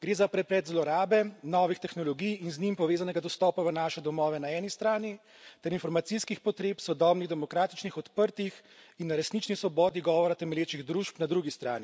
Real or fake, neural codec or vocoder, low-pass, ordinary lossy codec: real; none; none; none